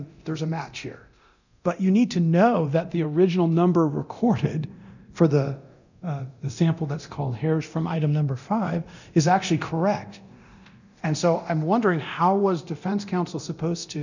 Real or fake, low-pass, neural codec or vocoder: fake; 7.2 kHz; codec, 24 kHz, 0.9 kbps, DualCodec